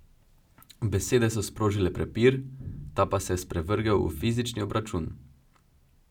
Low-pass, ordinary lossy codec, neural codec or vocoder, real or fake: 19.8 kHz; none; none; real